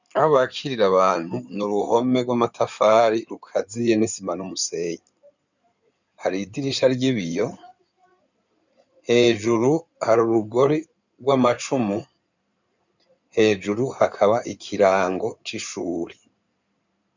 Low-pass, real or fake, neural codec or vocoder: 7.2 kHz; fake; codec, 16 kHz in and 24 kHz out, 2.2 kbps, FireRedTTS-2 codec